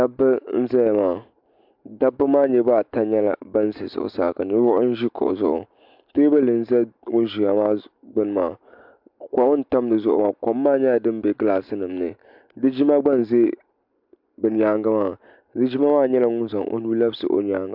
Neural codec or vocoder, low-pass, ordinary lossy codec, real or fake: none; 5.4 kHz; MP3, 48 kbps; real